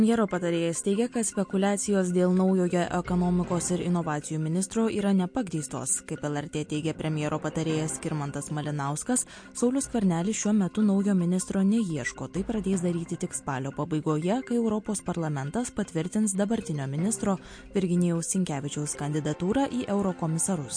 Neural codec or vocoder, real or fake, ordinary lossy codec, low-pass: none; real; MP3, 48 kbps; 9.9 kHz